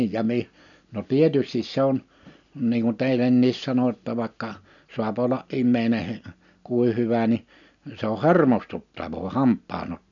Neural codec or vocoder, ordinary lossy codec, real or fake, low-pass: none; none; real; 7.2 kHz